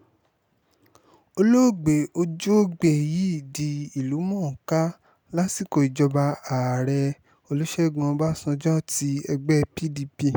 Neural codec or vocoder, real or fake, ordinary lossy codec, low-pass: none; real; none; none